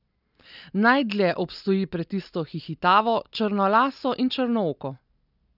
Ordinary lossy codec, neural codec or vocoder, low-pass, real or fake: none; none; 5.4 kHz; real